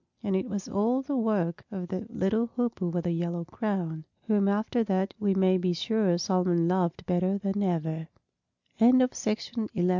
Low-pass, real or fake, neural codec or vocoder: 7.2 kHz; real; none